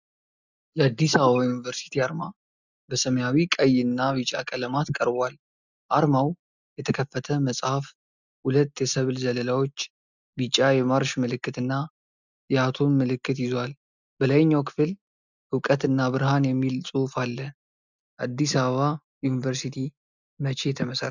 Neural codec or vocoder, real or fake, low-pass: none; real; 7.2 kHz